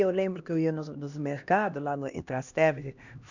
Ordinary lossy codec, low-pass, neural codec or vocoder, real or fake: none; 7.2 kHz; codec, 16 kHz, 1 kbps, X-Codec, HuBERT features, trained on LibriSpeech; fake